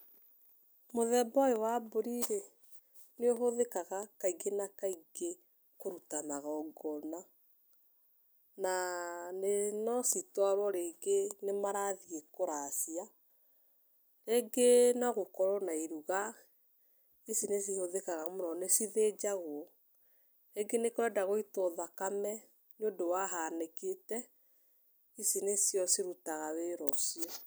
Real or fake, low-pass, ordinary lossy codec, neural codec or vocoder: real; none; none; none